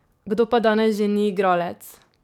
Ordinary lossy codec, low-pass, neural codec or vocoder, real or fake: none; 19.8 kHz; codec, 44.1 kHz, 7.8 kbps, DAC; fake